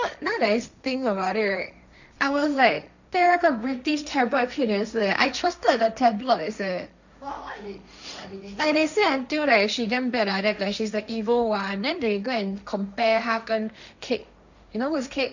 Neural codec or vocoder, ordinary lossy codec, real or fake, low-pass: codec, 16 kHz, 1.1 kbps, Voila-Tokenizer; none; fake; 7.2 kHz